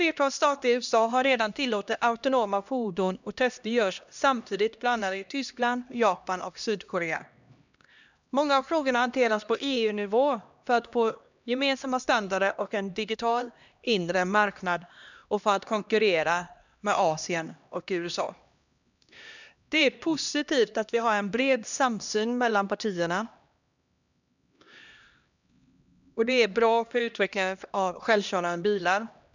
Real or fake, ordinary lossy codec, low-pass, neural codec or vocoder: fake; none; 7.2 kHz; codec, 16 kHz, 1 kbps, X-Codec, HuBERT features, trained on LibriSpeech